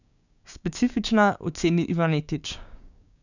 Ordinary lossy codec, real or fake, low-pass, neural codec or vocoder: none; fake; 7.2 kHz; codec, 16 kHz, 6 kbps, DAC